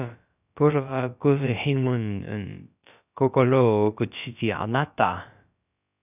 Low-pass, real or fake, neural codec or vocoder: 3.6 kHz; fake; codec, 16 kHz, about 1 kbps, DyCAST, with the encoder's durations